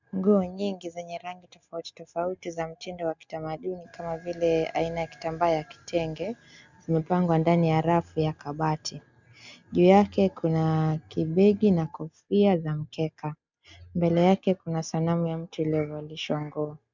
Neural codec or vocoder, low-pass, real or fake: none; 7.2 kHz; real